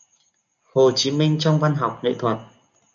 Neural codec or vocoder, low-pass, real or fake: none; 7.2 kHz; real